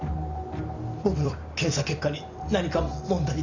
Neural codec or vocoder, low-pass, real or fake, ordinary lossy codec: vocoder, 44.1 kHz, 128 mel bands every 256 samples, BigVGAN v2; 7.2 kHz; fake; none